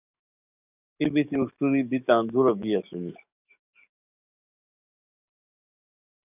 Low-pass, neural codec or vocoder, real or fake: 3.6 kHz; codec, 44.1 kHz, 7.8 kbps, DAC; fake